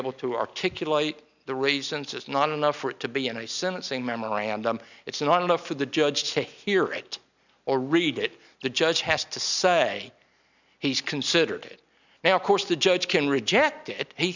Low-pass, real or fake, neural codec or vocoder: 7.2 kHz; real; none